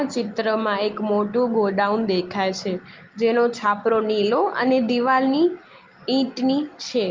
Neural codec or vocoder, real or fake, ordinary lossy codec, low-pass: none; real; Opus, 32 kbps; 7.2 kHz